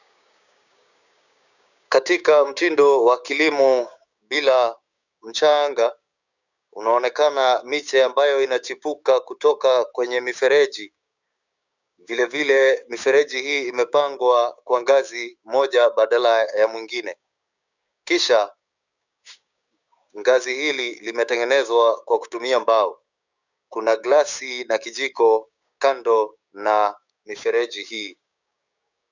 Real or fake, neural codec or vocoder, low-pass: fake; codec, 16 kHz, 6 kbps, DAC; 7.2 kHz